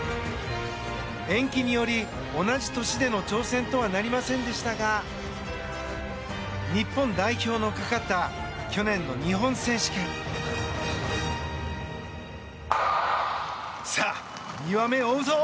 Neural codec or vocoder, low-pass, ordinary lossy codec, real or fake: none; none; none; real